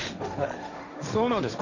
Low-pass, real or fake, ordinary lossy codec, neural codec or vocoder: 7.2 kHz; fake; none; codec, 16 kHz, 1.1 kbps, Voila-Tokenizer